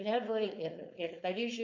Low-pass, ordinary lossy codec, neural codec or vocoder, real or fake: 7.2 kHz; MP3, 48 kbps; codec, 16 kHz, 4.8 kbps, FACodec; fake